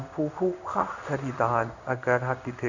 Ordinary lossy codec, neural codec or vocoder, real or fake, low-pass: none; codec, 16 kHz in and 24 kHz out, 1 kbps, XY-Tokenizer; fake; 7.2 kHz